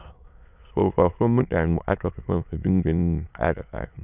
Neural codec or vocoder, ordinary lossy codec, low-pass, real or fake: autoencoder, 22.05 kHz, a latent of 192 numbers a frame, VITS, trained on many speakers; none; 3.6 kHz; fake